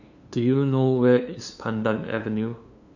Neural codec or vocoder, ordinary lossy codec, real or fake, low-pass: codec, 16 kHz, 2 kbps, FunCodec, trained on LibriTTS, 25 frames a second; none; fake; 7.2 kHz